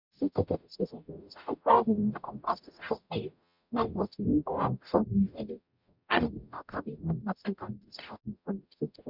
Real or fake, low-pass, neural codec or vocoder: fake; 5.4 kHz; codec, 44.1 kHz, 0.9 kbps, DAC